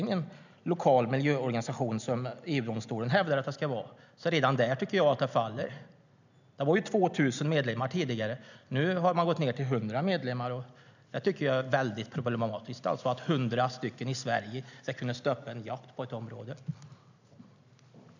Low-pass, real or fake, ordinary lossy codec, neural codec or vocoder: 7.2 kHz; real; none; none